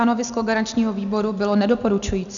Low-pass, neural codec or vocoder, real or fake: 7.2 kHz; none; real